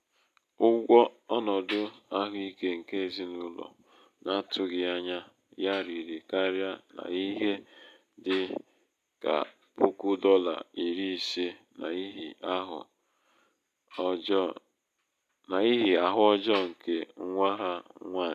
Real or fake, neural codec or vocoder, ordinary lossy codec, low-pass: real; none; none; 14.4 kHz